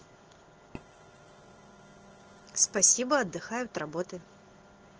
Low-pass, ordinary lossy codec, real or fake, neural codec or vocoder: 7.2 kHz; Opus, 16 kbps; real; none